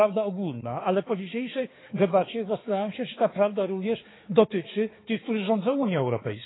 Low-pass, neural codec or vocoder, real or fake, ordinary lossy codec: 7.2 kHz; codec, 16 kHz, 4 kbps, X-Codec, HuBERT features, trained on balanced general audio; fake; AAC, 16 kbps